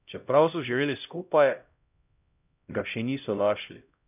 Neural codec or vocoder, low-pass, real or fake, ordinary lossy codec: codec, 16 kHz, 0.5 kbps, X-Codec, HuBERT features, trained on LibriSpeech; 3.6 kHz; fake; none